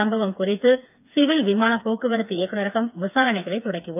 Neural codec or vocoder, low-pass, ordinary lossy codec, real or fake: codec, 16 kHz, 4 kbps, FreqCodec, smaller model; 3.6 kHz; none; fake